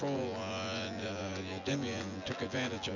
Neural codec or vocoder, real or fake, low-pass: vocoder, 24 kHz, 100 mel bands, Vocos; fake; 7.2 kHz